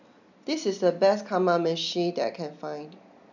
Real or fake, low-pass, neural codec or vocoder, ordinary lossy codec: real; 7.2 kHz; none; none